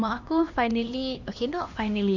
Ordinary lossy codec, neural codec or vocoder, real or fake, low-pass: Opus, 64 kbps; codec, 16 kHz, 2 kbps, X-Codec, HuBERT features, trained on LibriSpeech; fake; 7.2 kHz